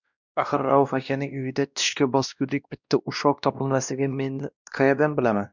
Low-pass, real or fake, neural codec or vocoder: 7.2 kHz; fake; codec, 16 kHz, 1 kbps, X-Codec, WavLM features, trained on Multilingual LibriSpeech